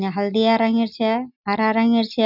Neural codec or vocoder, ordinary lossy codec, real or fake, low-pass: none; none; real; 5.4 kHz